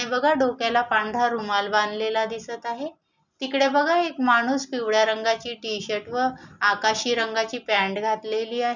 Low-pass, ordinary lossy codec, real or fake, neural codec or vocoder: 7.2 kHz; none; real; none